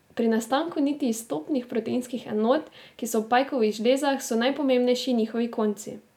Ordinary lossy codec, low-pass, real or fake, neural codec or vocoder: none; 19.8 kHz; real; none